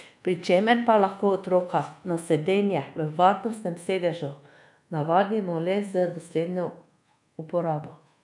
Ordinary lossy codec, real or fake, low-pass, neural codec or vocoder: none; fake; 10.8 kHz; codec, 24 kHz, 1.2 kbps, DualCodec